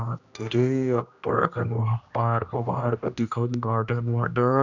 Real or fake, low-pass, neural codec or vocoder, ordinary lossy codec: fake; 7.2 kHz; codec, 16 kHz, 1 kbps, X-Codec, HuBERT features, trained on general audio; none